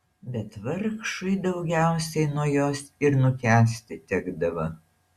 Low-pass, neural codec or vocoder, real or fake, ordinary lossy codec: 14.4 kHz; none; real; Opus, 64 kbps